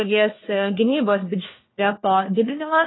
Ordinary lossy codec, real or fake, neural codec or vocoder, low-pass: AAC, 16 kbps; fake; codec, 16 kHz, 1 kbps, FunCodec, trained on LibriTTS, 50 frames a second; 7.2 kHz